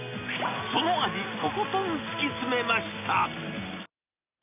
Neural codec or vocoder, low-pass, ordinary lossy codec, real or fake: none; 3.6 kHz; none; real